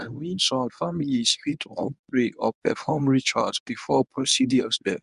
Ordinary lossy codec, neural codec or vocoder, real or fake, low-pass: none; codec, 24 kHz, 0.9 kbps, WavTokenizer, medium speech release version 1; fake; 10.8 kHz